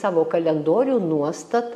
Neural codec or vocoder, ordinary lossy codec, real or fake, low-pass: none; MP3, 64 kbps; real; 14.4 kHz